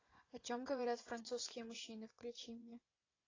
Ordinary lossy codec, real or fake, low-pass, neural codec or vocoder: AAC, 32 kbps; fake; 7.2 kHz; vocoder, 24 kHz, 100 mel bands, Vocos